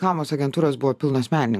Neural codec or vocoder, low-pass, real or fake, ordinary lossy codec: vocoder, 44.1 kHz, 128 mel bands every 512 samples, BigVGAN v2; 14.4 kHz; fake; AAC, 96 kbps